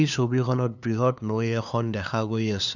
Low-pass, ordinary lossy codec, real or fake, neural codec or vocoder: 7.2 kHz; none; fake; codec, 16 kHz, 2 kbps, FunCodec, trained on LibriTTS, 25 frames a second